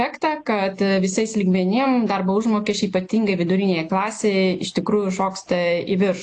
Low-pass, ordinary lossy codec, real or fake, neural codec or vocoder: 10.8 kHz; AAC, 48 kbps; real; none